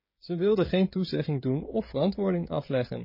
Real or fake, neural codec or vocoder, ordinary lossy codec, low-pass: fake; codec, 16 kHz, 16 kbps, FreqCodec, smaller model; MP3, 24 kbps; 5.4 kHz